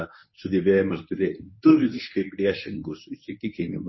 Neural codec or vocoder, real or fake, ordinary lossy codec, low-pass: codec, 24 kHz, 0.9 kbps, WavTokenizer, medium speech release version 1; fake; MP3, 24 kbps; 7.2 kHz